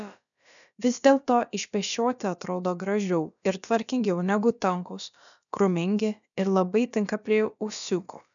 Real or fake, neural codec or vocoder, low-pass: fake; codec, 16 kHz, about 1 kbps, DyCAST, with the encoder's durations; 7.2 kHz